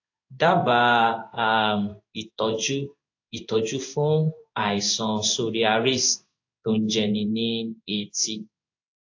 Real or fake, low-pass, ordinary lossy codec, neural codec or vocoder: fake; 7.2 kHz; AAC, 48 kbps; codec, 16 kHz in and 24 kHz out, 1 kbps, XY-Tokenizer